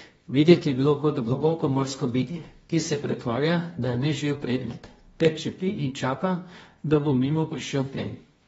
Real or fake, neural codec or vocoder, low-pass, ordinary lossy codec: fake; codec, 24 kHz, 0.9 kbps, WavTokenizer, medium music audio release; 10.8 kHz; AAC, 24 kbps